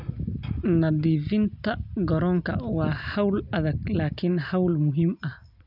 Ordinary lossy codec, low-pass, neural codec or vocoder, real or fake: none; 5.4 kHz; none; real